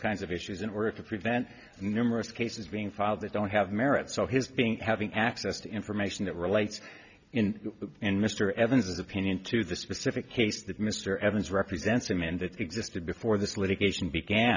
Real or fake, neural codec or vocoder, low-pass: real; none; 7.2 kHz